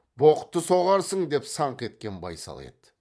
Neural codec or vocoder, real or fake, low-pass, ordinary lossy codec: vocoder, 22.05 kHz, 80 mel bands, Vocos; fake; none; none